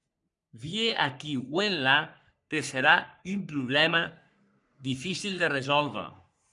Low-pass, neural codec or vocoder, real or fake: 10.8 kHz; codec, 44.1 kHz, 3.4 kbps, Pupu-Codec; fake